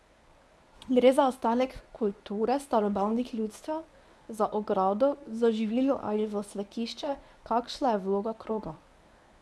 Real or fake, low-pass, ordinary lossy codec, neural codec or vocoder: fake; none; none; codec, 24 kHz, 0.9 kbps, WavTokenizer, medium speech release version 1